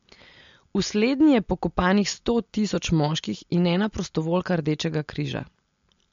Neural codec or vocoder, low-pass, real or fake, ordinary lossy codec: none; 7.2 kHz; real; MP3, 48 kbps